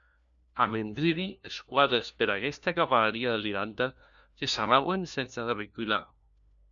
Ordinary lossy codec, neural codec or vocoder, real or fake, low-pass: MP3, 64 kbps; codec, 16 kHz, 1 kbps, FunCodec, trained on LibriTTS, 50 frames a second; fake; 7.2 kHz